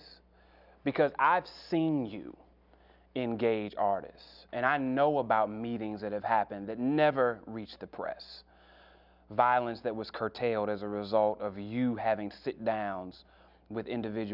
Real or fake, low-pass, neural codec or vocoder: real; 5.4 kHz; none